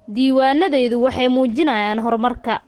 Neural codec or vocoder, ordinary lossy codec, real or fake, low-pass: codec, 44.1 kHz, 7.8 kbps, DAC; Opus, 16 kbps; fake; 19.8 kHz